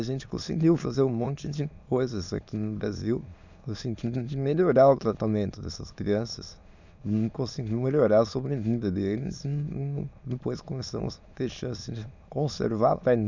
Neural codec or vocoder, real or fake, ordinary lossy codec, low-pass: autoencoder, 22.05 kHz, a latent of 192 numbers a frame, VITS, trained on many speakers; fake; none; 7.2 kHz